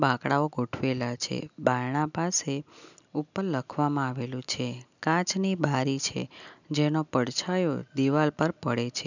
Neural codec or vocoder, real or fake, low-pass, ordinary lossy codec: none; real; 7.2 kHz; none